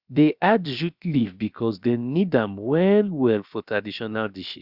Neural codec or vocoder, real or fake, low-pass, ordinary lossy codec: codec, 16 kHz, about 1 kbps, DyCAST, with the encoder's durations; fake; 5.4 kHz; Opus, 64 kbps